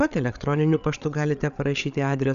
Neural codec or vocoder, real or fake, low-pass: codec, 16 kHz, 8 kbps, FreqCodec, larger model; fake; 7.2 kHz